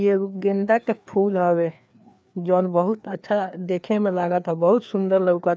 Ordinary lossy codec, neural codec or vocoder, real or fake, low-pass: none; codec, 16 kHz, 2 kbps, FreqCodec, larger model; fake; none